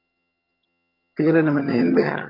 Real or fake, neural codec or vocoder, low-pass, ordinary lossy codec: fake; vocoder, 22.05 kHz, 80 mel bands, HiFi-GAN; 5.4 kHz; MP3, 32 kbps